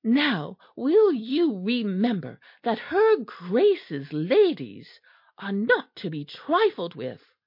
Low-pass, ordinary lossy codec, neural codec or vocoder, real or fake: 5.4 kHz; MP3, 32 kbps; none; real